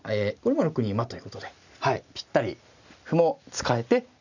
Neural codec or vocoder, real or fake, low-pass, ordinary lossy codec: none; real; 7.2 kHz; none